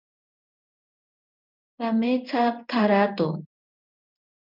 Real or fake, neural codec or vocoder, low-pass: fake; codec, 16 kHz in and 24 kHz out, 1 kbps, XY-Tokenizer; 5.4 kHz